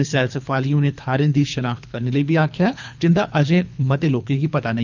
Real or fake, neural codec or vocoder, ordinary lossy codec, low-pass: fake; codec, 24 kHz, 3 kbps, HILCodec; none; 7.2 kHz